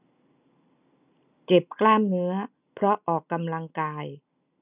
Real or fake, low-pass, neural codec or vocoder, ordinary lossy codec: real; 3.6 kHz; none; none